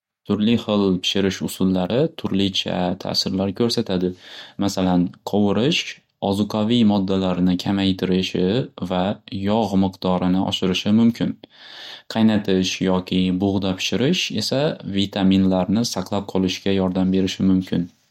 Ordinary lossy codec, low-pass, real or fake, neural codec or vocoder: MP3, 64 kbps; 19.8 kHz; real; none